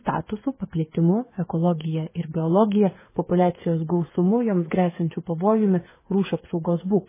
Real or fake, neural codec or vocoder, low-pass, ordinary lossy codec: fake; codec, 16 kHz, 8 kbps, FreqCodec, smaller model; 3.6 kHz; MP3, 16 kbps